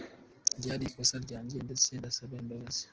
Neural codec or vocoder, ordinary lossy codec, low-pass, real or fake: none; Opus, 16 kbps; 7.2 kHz; real